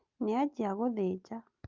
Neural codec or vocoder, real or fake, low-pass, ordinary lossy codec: none; real; 7.2 kHz; Opus, 32 kbps